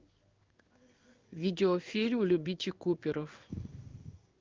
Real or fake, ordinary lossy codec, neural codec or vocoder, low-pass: fake; Opus, 24 kbps; codec, 16 kHz in and 24 kHz out, 1 kbps, XY-Tokenizer; 7.2 kHz